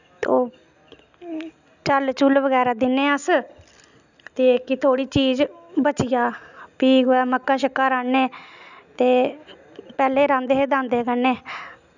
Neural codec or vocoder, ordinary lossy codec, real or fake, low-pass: none; none; real; 7.2 kHz